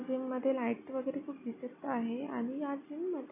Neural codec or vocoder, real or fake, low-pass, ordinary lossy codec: none; real; 3.6 kHz; none